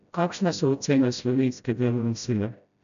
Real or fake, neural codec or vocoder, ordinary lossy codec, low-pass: fake; codec, 16 kHz, 0.5 kbps, FreqCodec, smaller model; none; 7.2 kHz